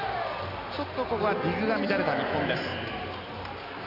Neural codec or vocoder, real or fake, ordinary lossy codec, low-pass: none; real; none; 5.4 kHz